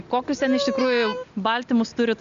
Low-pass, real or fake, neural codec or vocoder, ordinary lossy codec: 7.2 kHz; real; none; MP3, 64 kbps